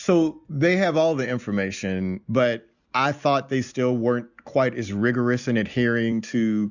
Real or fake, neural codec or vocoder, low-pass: real; none; 7.2 kHz